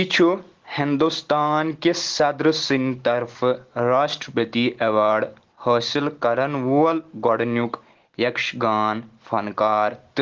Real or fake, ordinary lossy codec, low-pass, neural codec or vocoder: real; Opus, 16 kbps; 7.2 kHz; none